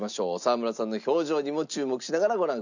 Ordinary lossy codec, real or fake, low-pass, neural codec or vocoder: none; real; 7.2 kHz; none